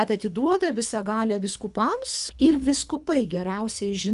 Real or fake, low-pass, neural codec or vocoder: fake; 10.8 kHz; codec, 24 kHz, 3 kbps, HILCodec